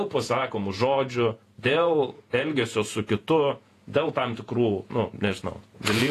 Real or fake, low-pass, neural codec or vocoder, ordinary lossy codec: fake; 14.4 kHz; vocoder, 48 kHz, 128 mel bands, Vocos; AAC, 48 kbps